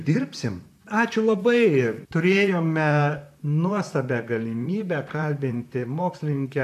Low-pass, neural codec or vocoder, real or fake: 14.4 kHz; vocoder, 44.1 kHz, 128 mel bands, Pupu-Vocoder; fake